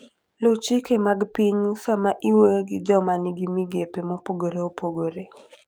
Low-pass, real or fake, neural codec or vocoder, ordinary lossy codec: none; fake; codec, 44.1 kHz, 7.8 kbps, DAC; none